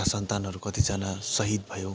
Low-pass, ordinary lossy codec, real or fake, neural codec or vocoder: none; none; real; none